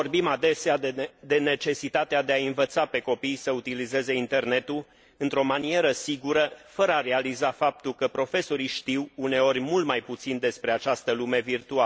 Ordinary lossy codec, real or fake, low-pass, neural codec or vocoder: none; real; none; none